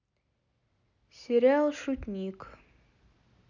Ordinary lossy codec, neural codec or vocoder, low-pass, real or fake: none; none; 7.2 kHz; real